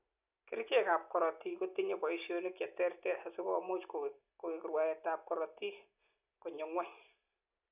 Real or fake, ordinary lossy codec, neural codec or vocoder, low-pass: real; none; none; 3.6 kHz